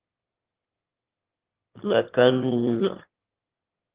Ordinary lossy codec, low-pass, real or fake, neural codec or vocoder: Opus, 16 kbps; 3.6 kHz; fake; autoencoder, 22.05 kHz, a latent of 192 numbers a frame, VITS, trained on one speaker